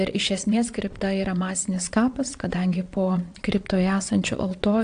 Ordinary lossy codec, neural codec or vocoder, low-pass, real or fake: AAC, 64 kbps; vocoder, 22.05 kHz, 80 mel bands, Vocos; 9.9 kHz; fake